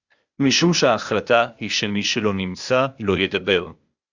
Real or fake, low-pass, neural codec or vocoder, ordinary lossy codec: fake; 7.2 kHz; codec, 16 kHz, 0.8 kbps, ZipCodec; Opus, 64 kbps